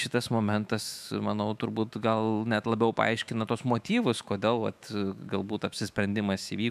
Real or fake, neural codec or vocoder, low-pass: fake; autoencoder, 48 kHz, 128 numbers a frame, DAC-VAE, trained on Japanese speech; 14.4 kHz